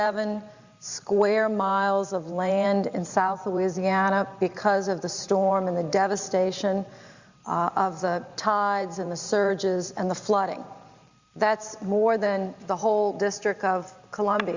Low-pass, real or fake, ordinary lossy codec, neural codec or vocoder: 7.2 kHz; fake; Opus, 64 kbps; vocoder, 44.1 kHz, 128 mel bands every 512 samples, BigVGAN v2